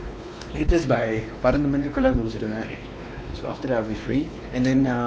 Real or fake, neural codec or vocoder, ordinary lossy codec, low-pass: fake; codec, 16 kHz, 2 kbps, X-Codec, WavLM features, trained on Multilingual LibriSpeech; none; none